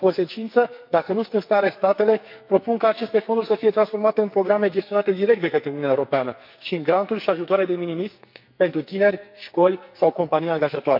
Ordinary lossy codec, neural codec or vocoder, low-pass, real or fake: MP3, 48 kbps; codec, 44.1 kHz, 2.6 kbps, SNAC; 5.4 kHz; fake